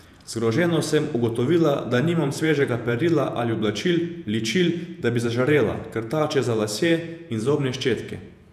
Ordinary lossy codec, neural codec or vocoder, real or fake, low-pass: none; vocoder, 48 kHz, 128 mel bands, Vocos; fake; 14.4 kHz